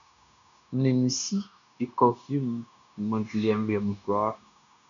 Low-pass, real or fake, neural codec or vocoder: 7.2 kHz; fake; codec, 16 kHz, 0.9 kbps, LongCat-Audio-Codec